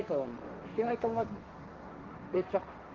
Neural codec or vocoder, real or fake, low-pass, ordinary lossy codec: codec, 16 kHz, 1.1 kbps, Voila-Tokenizer; fake; 7.2 kHz; Opus, 16 kbps